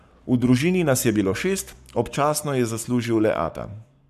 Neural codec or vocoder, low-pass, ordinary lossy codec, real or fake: codec, 44.1 kHz, 7.8 kbps, Pupu-Codec; 14.4 kHz; none; fake